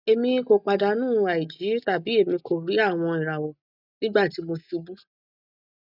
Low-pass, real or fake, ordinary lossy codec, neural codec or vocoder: 5.4 kHz; real; none; none